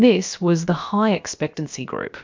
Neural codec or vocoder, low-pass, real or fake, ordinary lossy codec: codec, 16 kHz, about 1 kbps, DyCAST, with the encoder's durations; 7.2 kHz; fake; MP3, 64 kbps